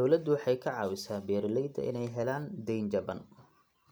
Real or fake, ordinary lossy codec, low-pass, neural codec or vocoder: real; none; none; none